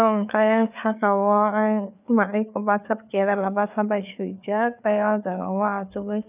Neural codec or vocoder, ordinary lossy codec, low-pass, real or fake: codec, 16 kHz, 4 kbps, FreqCodec, larger model; none; 3.6 kHz; fake